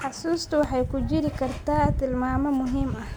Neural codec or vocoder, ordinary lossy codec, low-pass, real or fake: none; none; none; real